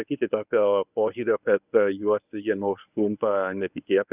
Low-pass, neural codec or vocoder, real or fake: 3.6 kHz; codec, 24 kHz, 0.9 kbps, WavTokenizer, medium speech release version 1; fake